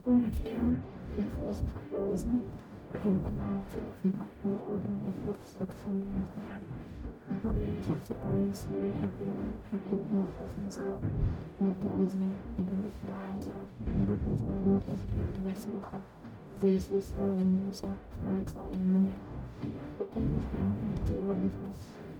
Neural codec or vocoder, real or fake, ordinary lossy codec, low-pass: codec, 44.1 kHz, 0.9 kbps, DAC; fake; none; none